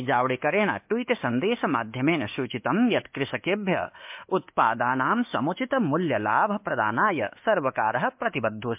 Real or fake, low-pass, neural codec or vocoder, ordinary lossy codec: fake; 3.6 kHz; codec, 24 kHz, 1.2 kbps, DualCodec; MP3, 32 kbps